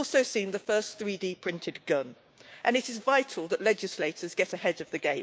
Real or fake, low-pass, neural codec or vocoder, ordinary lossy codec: fake; none; codec, 16 kHz, 6 kbps, DAC; none